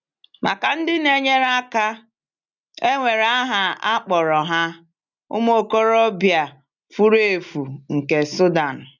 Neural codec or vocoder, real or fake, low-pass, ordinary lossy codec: none; real; 7.2 kHz; none